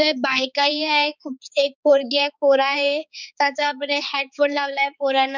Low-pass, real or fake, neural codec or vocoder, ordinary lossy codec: 7.2 kHz; fake; codec, 16 kHz, 4 kbps, X-Codec, HuBERT features, trained on balanced general audio; none